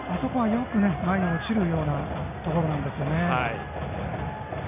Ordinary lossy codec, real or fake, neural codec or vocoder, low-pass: none; real; none; 3.6 kHz